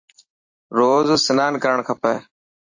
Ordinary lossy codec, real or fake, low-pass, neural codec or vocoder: AAC, 48 kbps; fake; 7.2 kHz; vocoder, 44.1 kHz, 128 mel bands every 256 samples, BigVGAN v2